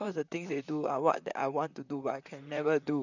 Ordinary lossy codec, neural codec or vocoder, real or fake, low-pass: none; vocoder, 44.1 kHz, 128 mel bands, Pupu-Vocoder; fake; 7.2 kHz